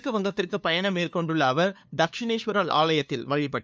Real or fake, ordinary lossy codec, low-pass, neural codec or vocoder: fake; none; none; codec, 16 kHz, 2 kbps, FunCodec, trained on LibriTTS, 25 frames a second